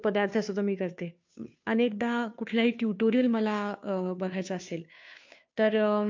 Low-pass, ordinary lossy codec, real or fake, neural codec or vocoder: 7.2 kHz; MP3, 48 kbps; fake; codec, 16 kHz, 2 kbps, FunCodec, trained on Chinese and English, 25 frames a second